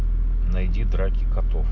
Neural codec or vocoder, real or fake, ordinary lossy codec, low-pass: none; real; none; 7.2 kHz